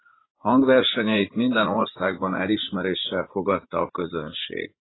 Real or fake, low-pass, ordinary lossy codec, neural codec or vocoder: fake; 7.2 kHz; AAC, 16 kbps; vocoder, 44.1 kHz, 80 mel bands, Vocos